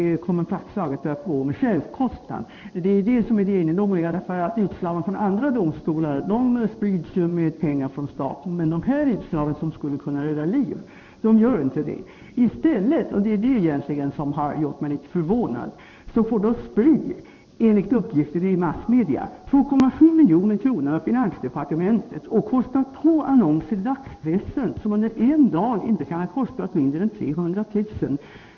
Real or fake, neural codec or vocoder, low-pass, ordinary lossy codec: fake; codec, 16 kHz in and 24 kHz out, 1 kbps, XY-Tokenizer; 7.2 kHz; none